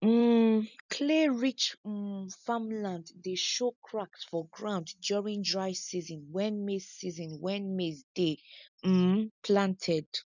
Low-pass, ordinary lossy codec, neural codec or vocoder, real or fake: 7.2 kHz; none; none; real